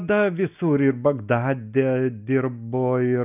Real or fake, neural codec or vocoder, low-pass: real; none; 3.6 kHz